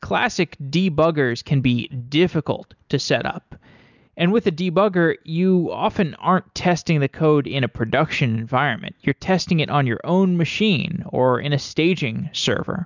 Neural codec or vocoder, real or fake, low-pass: none; real; 7.2 kHz